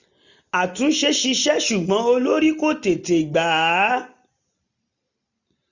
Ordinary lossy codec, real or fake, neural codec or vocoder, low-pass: none; real; none; 7.2 kHz